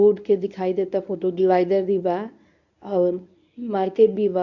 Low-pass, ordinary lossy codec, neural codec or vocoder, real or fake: 7.2 kHz; none; codec, 24 kHz, 0.9 kbps, WavTokenizer, medium speech release version 1; fake